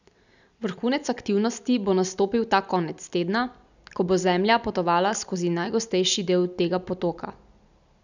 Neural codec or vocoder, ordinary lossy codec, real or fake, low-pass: none; none; real; 7.2 kHz